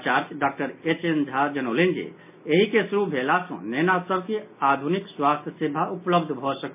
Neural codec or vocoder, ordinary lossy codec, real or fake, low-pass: none; MP3, 32 kbps; real; 3.6 kHz